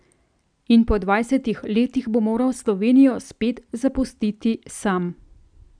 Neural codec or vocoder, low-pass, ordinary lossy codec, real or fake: none; 9.9 kHz; none; real